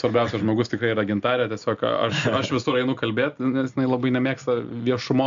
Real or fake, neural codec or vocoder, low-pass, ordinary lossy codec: real; none; 7.2 kHz; MP3, 64 kbps